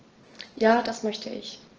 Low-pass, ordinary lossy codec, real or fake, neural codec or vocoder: 7.2 kHz; Opus, 16 kbps; real; none